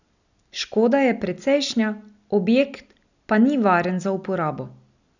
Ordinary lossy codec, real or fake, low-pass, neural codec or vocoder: none; real; 7.2 kHz; none